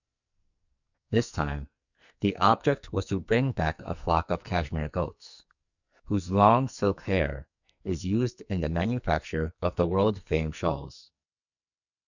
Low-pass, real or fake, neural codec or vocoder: 7.2 kHz; fake; codec, 44.1 kHz, 2.6 kbps, SNAC